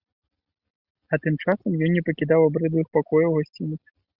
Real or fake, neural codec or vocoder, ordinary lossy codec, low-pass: real; none; Opus, 64 kbps; 5.4 kHz